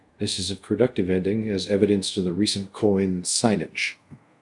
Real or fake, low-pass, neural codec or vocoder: fake; 10.8 kHz; codec, 24 kHz, 0.5 kbps, DualCodec